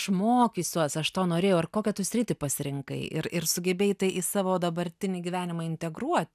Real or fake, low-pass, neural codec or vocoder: real; 14.4 kHz; none